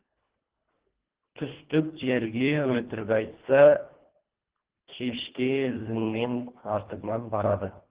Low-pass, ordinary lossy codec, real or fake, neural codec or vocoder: 3.6 kHz; Opus, 16 kbps; fake; codec, 24 kHz, 1.5 kbps, HILCodec